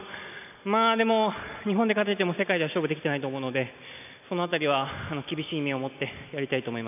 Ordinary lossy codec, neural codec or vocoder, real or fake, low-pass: none; none; real; 3.6 kHz